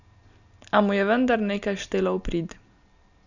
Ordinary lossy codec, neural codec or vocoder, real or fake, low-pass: none; none; real; 7.2 kHz